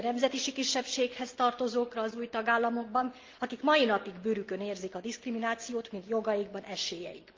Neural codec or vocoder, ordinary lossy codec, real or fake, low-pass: none; Opus, 32 kbps; real; 7.2 kHz